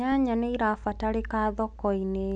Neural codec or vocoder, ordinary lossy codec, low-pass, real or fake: none; none; 9.9 kHz; real